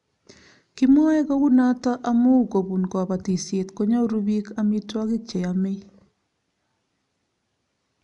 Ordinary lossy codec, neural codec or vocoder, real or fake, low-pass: none; none; real; 10.8 kHz